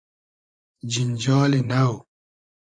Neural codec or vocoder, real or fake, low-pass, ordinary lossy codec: vocoder, 24 kHz, 100 mel bands, Vocos; fake; 9.9 kHz; AAC, 64 kbps